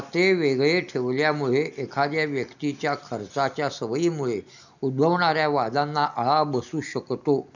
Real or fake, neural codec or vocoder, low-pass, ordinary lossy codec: real; none; 7.2 kHz; none